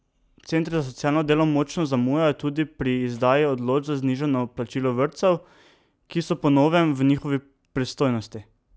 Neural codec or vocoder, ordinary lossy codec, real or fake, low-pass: none; none; real; none